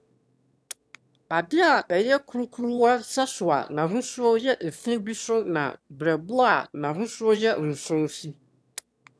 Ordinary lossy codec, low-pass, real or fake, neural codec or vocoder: none; none; fake; autoencoder, 22.05 kHz, a latent of 192 numbers a frame, VITS, trained on one speaker